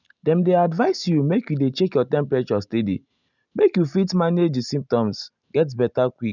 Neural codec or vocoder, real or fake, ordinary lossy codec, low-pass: none; real; none; 7.2 kHz